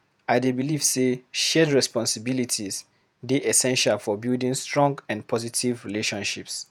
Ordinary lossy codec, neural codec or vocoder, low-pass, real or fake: none; vocoder, 48 kHz, 128 mel bands, Vocos; none; fake